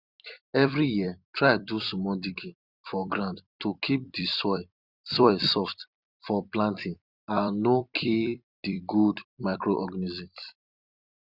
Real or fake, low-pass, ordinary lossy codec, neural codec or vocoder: fake; 5.4 kHz; Opus, 64 kbps; vocoder, 44.1 kHz, 128 mel bands every 256 samples, BigVGAN v2